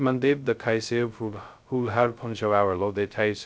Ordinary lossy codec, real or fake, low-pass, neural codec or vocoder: none; fake; none; codec, 16 kHz, 0.2 kbps, FocalCodec